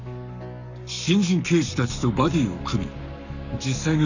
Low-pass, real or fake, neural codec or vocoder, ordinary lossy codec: 7.2 kHz; fake; codec, 44.1 kHz, 7.8 kbps, Pupu-Codec; none